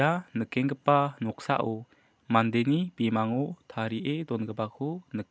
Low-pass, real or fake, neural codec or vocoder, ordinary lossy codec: none; real; none; none